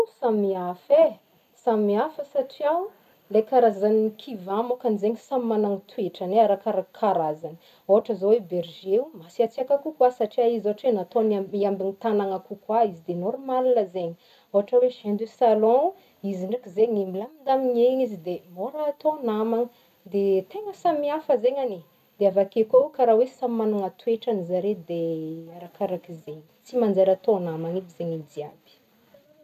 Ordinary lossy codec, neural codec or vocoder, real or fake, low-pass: none; none; real; 14.4 kHz